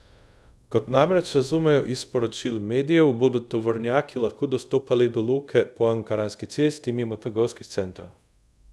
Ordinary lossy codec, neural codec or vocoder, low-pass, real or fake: none; codec, 24 kHz, 0.5 kbps, DualCodec; none; fake